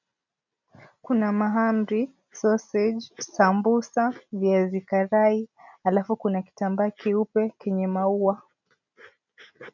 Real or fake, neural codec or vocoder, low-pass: real; none; 7.2 kHz